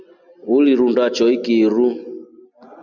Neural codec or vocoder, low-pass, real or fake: none; 7.2 kHz; real